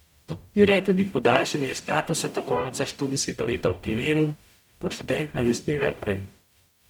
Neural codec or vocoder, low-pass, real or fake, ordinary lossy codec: codec, 44.1 kHz, 0.9 kbps, DAC; 19.8 kHz; fake; none